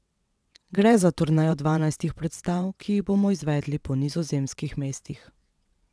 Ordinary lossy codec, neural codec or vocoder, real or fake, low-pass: none; vocoder, 22.05 kHz, 80 mel bands, WaveNeXt; fake; none